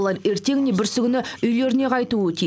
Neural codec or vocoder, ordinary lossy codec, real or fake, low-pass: none; none; real; none